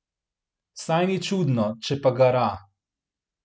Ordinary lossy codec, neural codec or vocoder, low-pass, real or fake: none; none; none; real